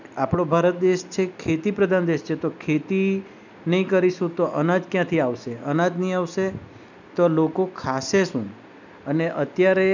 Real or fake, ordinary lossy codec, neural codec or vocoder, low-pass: real; none; none; 7.2 kHz